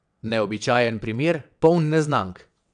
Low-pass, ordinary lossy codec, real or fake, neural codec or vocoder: 9.9 kHz; none; fake; vocoder, 22.05 kHz, 80 mel bands, WaveNeXt